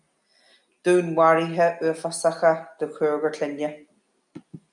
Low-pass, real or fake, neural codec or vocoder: 10.8 kHz; real; none